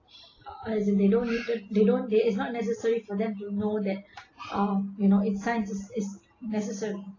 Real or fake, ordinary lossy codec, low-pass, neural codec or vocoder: real; AAC, 32 kbps; 7.2 kHz; none